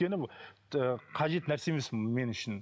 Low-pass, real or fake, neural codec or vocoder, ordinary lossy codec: none; real; none; none